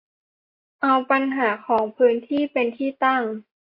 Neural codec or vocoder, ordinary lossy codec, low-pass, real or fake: none; MP3, 32 kbps; 5.4 kHz; real